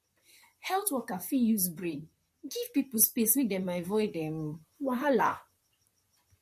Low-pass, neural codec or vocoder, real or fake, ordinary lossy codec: 14.4 kHz; vocoder, 44.1 kHz, 128 mel bands, Pupu-Vocoder; fake; MP3, 64 kbps